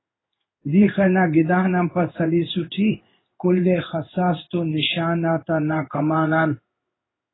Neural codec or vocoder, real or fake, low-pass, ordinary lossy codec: codec, 16 kHz in and 24 kHz out, 1 kbps, XY-Tokenizer; fake; 7.2 kHz; AAC, 16 kbps